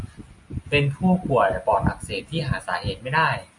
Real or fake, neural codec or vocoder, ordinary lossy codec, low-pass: real; none; MP3, 48 kbps; 10.8 kHz